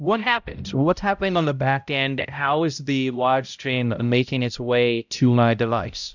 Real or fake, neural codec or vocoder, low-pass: fake; codec, 16 kHz, 0.5 kbps, X-Codec, HuBERT features, trained on balanced general audio; 7.2 kHz